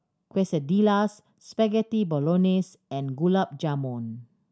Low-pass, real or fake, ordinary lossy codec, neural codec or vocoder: none; real; none; none